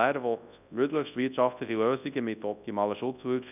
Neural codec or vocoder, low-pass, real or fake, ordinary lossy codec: codec, 24 kHz, 0.9 kbps, WavTokenizer, large speech release; 3.6 kHz; fake; none